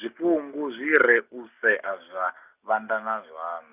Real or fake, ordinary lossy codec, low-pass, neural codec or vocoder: real; none; 3.6 kHz; none